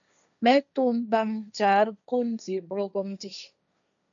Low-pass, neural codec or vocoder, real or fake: 7.2 kHz; codec, 16 kHz, 1.1 kbps, Voila-Tokenizer; fake